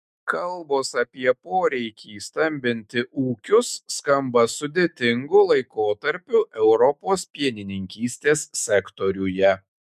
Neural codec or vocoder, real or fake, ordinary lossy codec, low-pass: autoencoder, 48 kHz, 128 numbers a frame, DAC-VAE, trained on Japanese speech; fake; MP3, 96 kbps; 14.4 kHz